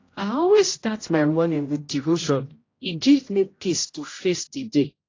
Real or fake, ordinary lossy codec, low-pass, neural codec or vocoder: fake; AAC, 32 kbps; 7.2 kHz; codec, 16 kHz, 0.5 kbps, X-Codec, HuBERT features, trained on general audio